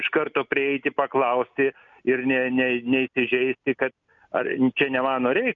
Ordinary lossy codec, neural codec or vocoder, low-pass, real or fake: MP3, 96 kbps; none; 7.2 kHz; real